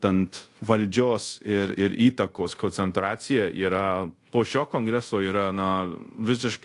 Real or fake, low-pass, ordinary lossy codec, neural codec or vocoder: fake; 10.8 kHz; AAC, 48 kbps; codec, 24 kHz, 0.5 kbps, DualCodec